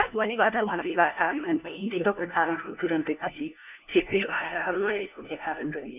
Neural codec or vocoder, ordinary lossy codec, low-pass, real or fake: codec, 16 kHz, 1 kbps, FunCodec, trained on LibriTTS, 50 frames a second; none; 3.6 kHz; fake